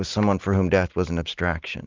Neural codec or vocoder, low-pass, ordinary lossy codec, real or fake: none; 7.2 kHz; Opus, 32 kbps; real